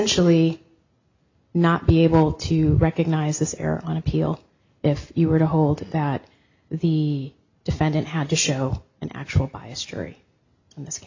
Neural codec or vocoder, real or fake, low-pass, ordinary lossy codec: none; real; 7.2 kHz; AAC, 48 kbps